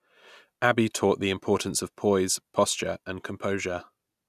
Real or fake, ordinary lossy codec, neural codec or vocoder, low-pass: real; none; none; 14.4 kHz